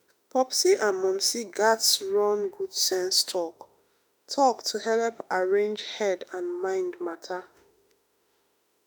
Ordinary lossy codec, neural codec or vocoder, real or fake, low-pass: none; autoencoder, 48 kHz, 32 numbers a frame, DAC-VAE, trained on Japanese speech; fake; none